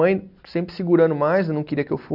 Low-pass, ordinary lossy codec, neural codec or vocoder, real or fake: 5.4 kHz; none; none; real